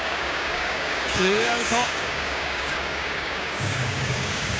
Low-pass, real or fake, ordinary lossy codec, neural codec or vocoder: none; fake; none; codec, 16 kHz, 6 kbps, DAC